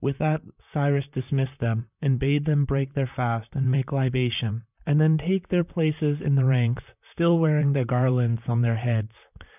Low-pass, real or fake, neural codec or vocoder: 3.6 kHz; fake; vocoder, 44.1 kHz, 128 mel bands, Pupu-Vocoder